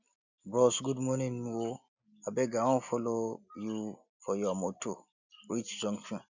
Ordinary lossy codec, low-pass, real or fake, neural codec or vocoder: none; 7.2 kHz; real; none